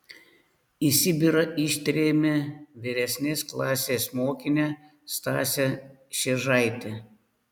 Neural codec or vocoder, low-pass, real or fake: none; 19.8 kHz; real